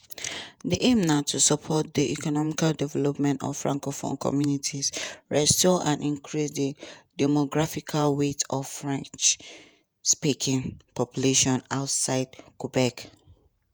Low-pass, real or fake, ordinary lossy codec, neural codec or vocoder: none; fake; none; vocoder, 48 kHz, 128 mel bands, Vocos